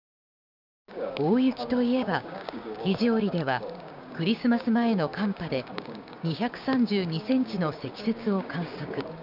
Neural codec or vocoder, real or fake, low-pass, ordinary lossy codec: codec, 16 kHz, 6 kbps, DAC; fake; 5.4 kHz; none